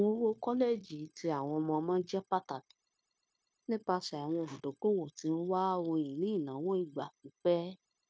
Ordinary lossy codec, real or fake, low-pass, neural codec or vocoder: none; fake; none; codec, 16 kHz, 0.9 kbps, LongCat-Audio-Codec